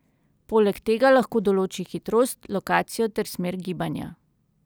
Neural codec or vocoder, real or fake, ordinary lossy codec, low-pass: none; real; none; none